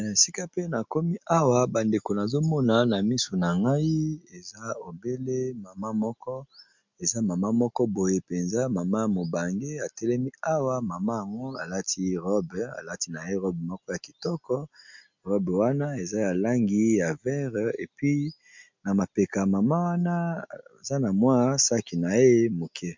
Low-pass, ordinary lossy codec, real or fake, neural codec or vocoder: 7.2 kHz; MP3, 64 kbps; real; none